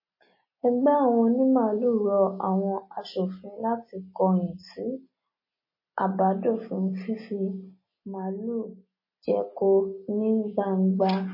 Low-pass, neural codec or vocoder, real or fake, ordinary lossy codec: 5.4 kHz; none; real; MP3, 24 kbps